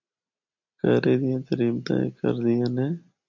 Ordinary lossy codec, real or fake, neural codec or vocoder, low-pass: MP3, 64 kbps; real; none; 7.2 kHz